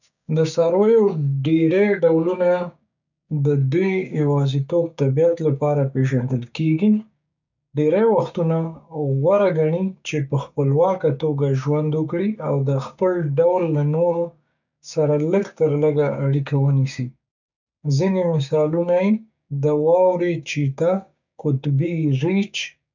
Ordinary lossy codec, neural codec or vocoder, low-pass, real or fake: none; codec, 16 kHz, 6 kbps, DAC; 7.2 kHz; fake